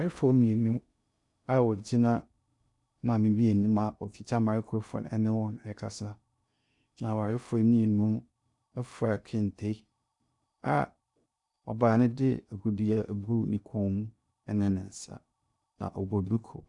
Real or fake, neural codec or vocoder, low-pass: fake; codec, 16 kHz in and 24 kHz out, 0.6 kbps, FocalCodec, streaming, 2048 codes; 10.8 kHz